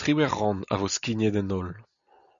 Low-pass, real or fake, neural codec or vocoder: 7.2 kHz; real; none